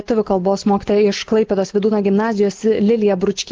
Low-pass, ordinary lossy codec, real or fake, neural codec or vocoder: 7.2 kHz; Opus, 16 kbps; real; none